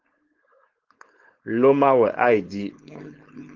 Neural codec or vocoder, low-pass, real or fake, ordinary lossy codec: codec, 16 kHz, 4.8 kbps, FACodec; 7.2 kHz; fake; Opus, 16 kbps